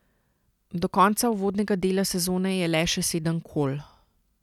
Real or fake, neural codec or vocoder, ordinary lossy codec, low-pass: real; none; none; 19.8 kHz